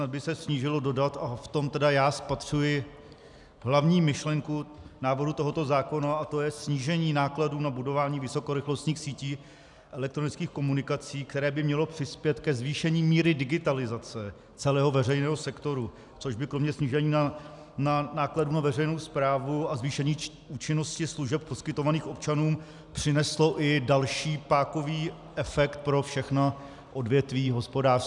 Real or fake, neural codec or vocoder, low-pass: real; none; 10.8 kHz